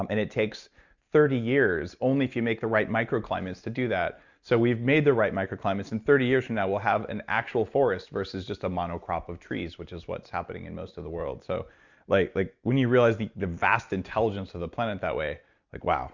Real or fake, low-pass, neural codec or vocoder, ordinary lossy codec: real; 7.2 kHz; none; Opus, 64 kbps